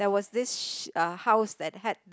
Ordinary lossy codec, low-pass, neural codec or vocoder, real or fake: none; none; none; real